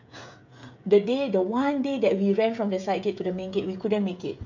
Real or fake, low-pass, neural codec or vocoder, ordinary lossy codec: fake; 7.2 kHz; codec, 16 kHz, 16 kbps, FreqCodec, smaller model; none